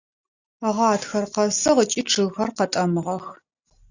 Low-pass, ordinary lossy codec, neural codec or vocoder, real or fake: 7.2 kHz; Opus, 64 kbps; none; real